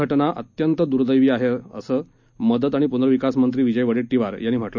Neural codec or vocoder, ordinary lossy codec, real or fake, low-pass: none; none; real; 7.2 kHz